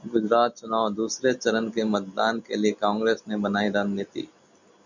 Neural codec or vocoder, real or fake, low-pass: none; real; 7.2 kHz